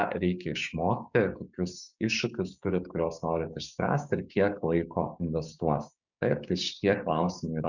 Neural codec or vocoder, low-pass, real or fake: codec, 16 kHz, 6 kbps, DAC; 7.2 kHz; fake